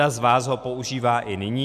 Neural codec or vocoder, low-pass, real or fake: none; 14.4 kHz; real